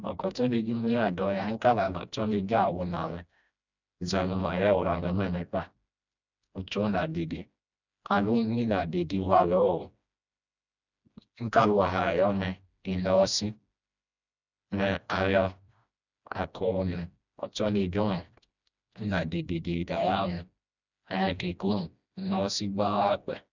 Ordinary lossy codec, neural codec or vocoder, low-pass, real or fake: none; codec, 16 kHz, 1 kbps, FreqCodec, smaller model; 7.2 kHz; fake